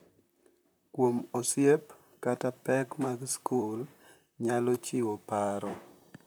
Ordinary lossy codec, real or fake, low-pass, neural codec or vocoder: none; fake; none; vocoder, 44.1 kHz, 128 mel bands, Pupu-Vocoder